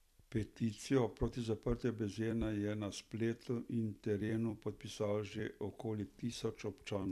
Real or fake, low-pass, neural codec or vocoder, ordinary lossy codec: fake; 14.4 kHz; vocoder, 44.1 kHz, 128 mel bands every 256 samples, BigVGAN v2; none